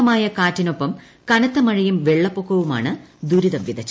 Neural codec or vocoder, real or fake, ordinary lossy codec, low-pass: none; real; none; none